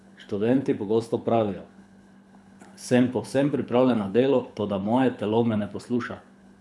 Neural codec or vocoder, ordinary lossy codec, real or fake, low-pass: codec, 24 kHz, 6 kbps, HILCodec; none; fake; none